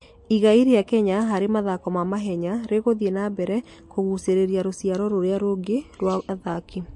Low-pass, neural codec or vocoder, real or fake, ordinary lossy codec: 10.8 kHz; none; real; MP3, 48 kbps